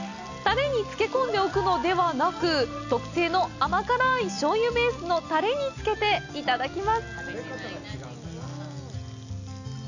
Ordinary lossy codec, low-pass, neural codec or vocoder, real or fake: none; 7.2 kHz; none; real